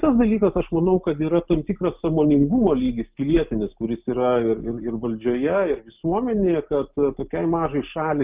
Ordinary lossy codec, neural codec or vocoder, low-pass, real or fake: Opus, 24 kbps; none; 3.6 kHz; real